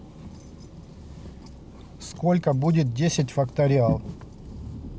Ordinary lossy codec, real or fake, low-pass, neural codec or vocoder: none; real; none; none